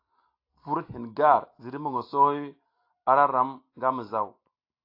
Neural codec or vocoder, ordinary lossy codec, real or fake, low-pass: none; AAC, 32 kbps; real; 5.4 kHz